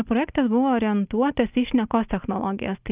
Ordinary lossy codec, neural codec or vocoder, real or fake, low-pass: Opus, 24 kbps; none; real; 3.6 kHz